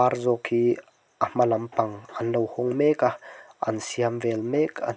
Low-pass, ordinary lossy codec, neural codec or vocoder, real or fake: none; none; none; real